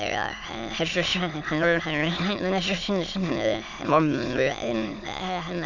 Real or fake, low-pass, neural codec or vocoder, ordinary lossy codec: fake; 7.2 kHz; autoencoder, 22.05 kHz, a latent of 192 numbers a frame, VITS, trained on many speakers; none